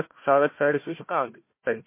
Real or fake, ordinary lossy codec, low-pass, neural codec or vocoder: fake; MP3, 24 kbps; 3.6 kHz; codec, 16 kHz, 1 kbps, FunCodec, trained on Chinese and English, 50 frames a second